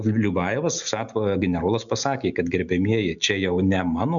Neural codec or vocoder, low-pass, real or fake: none; 7.2 kHz; real